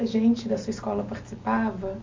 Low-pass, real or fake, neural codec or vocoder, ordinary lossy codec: 7.2 kHz; real; none; MP3, 48 kbps